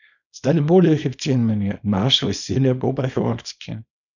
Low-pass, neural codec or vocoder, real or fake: 7.2 kHz; codec, 24 kHz, 0.9 kbps, WavTokenizer, small release; fake